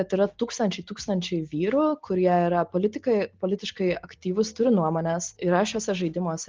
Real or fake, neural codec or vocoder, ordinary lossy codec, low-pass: real; none; Opus, 16 kbps; 7.2 kHz